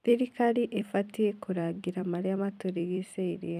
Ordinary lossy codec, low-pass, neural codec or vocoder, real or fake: none; 14.4 kHz; vocoder, 44.1 kHz, 128 mel bands every 256 samples, BigVGAN v2; fake